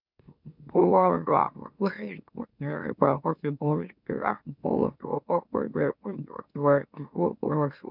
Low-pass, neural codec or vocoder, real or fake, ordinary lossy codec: 5.4 kHz; autoencoder, 44.1 kHz, a latent of 192 numbers a frame, MeloTTS; fake; none